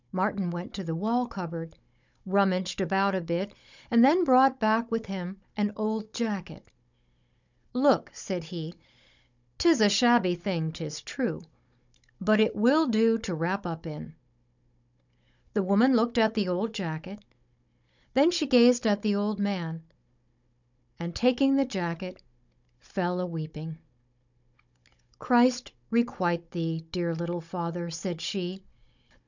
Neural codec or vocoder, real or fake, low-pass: codec, 16 kHz, 16 kbps, FunCodec, trained on Chinese and English, 50 frames a second; fake; 7.2 kHz